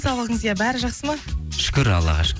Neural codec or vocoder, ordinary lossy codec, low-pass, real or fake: none; none; none; real